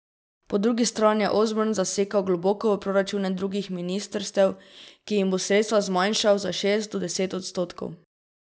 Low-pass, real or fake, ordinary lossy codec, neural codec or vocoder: none; real; none; none